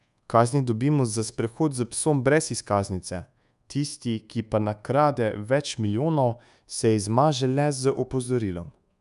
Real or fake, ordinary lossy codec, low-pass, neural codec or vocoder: fake; none; 10.8 kHz; codec, 24 kHz, 1.2 kbps, DualCodec